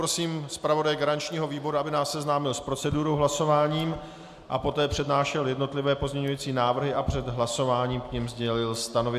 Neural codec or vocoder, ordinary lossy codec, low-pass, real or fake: none; AAC, 96 kbps; 14.4 kHz; real